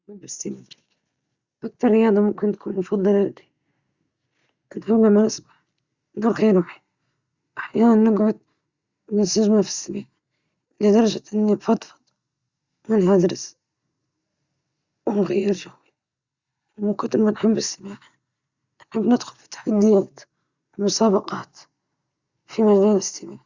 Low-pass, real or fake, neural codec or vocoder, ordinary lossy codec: 7.2 kHz; real; none; Opus, 64 kbps